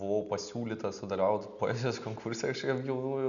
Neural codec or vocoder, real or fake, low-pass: none; real; 7.2 kHz